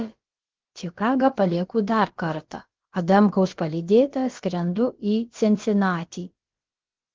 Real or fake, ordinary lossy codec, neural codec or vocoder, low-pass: fake; Opus, 16 kbps; codec, 16 kHz, about 1 kbps, DyCAST, with the encoder's durations; 7.2 kHz